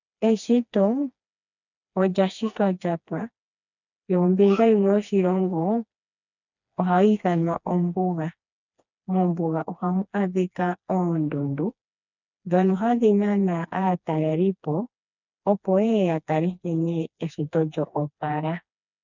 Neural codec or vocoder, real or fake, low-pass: codec, 16 kHz, 2 kbps, FreqCodec, smaller model; fake; 7.2 kHz